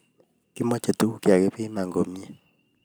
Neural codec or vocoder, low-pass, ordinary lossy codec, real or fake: none; none; none; real